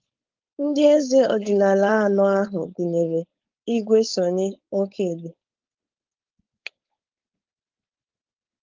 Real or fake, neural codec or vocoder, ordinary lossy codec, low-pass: fake; codec, 16 kHz, 4.8 kbps, FACodec; Opus, 32 kbps; 7.2 kHz